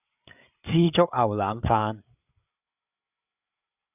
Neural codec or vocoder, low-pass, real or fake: codec, 16 kHz, 8 kbps, FreqCodec, larger model; 3.6 kHz; fake